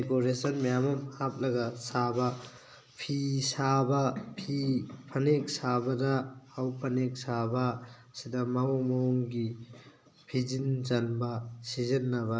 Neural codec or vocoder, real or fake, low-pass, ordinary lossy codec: none; real; none; none